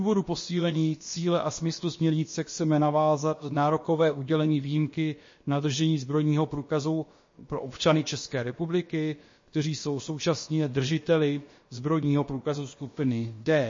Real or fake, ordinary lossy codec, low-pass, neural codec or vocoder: fake; MP3, 32 kbps; 7.2 kHz; codec, 16 kHz, about 1 kbps, DyCAST, with the encoder's durations